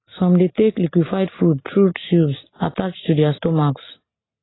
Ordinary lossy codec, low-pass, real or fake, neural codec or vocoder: AAC, 16 kbps; 7.2 kHz; real; none